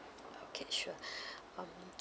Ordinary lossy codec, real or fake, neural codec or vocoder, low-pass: none; real; none; none